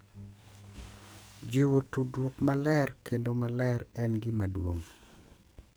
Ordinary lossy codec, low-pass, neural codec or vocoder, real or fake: none; none; codec, 44.1 kHz, 2.6 kbps, SNAC; fake